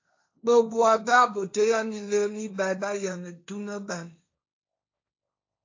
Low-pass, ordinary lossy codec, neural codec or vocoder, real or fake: 7.2 kHz; AAC, 48 kbps; codec, 16 kHz, 1.1 kbps, Voila-Tokenizer; fake